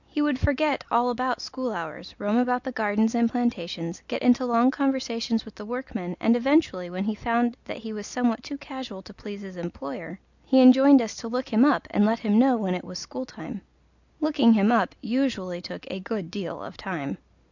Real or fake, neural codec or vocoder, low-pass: real; none; 7.2 kHz